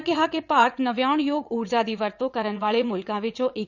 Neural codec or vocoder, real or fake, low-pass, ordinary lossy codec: vocoder, 22.05 kHz, 80 mel bands, WaveNeXt; fake; 7.2 kHz; none